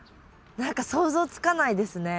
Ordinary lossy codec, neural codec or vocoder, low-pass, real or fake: none; none; none; real